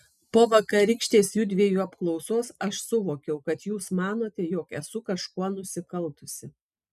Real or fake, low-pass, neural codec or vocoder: real; 14.4 kHz; none